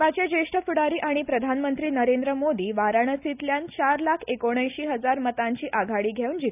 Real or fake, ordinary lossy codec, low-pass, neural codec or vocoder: real; none; 3.6 kHz; none